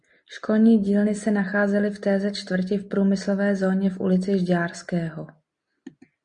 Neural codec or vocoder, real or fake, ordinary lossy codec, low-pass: none; real; MP3, 96 kbps; 9.9 kHz